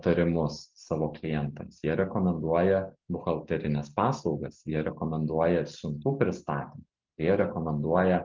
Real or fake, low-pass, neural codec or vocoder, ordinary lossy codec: real; 7.2 kHz; none; Opus, 24 kbps